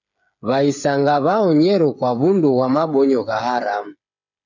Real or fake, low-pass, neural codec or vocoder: fake; 7.2 kHz; codec, 16 kHz, 8 kbps, FreqCodec, smaller model